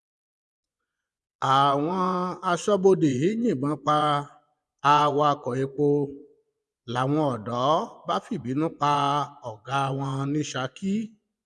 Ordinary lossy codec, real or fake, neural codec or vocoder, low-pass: none; fake; vocoder, 24 kHz, 100 mel bands, Vocos; none